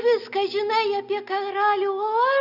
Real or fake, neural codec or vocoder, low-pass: real; none; 5.4 kHz